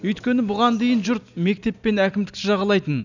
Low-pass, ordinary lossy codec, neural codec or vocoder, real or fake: 7.2 kHz; none; none; real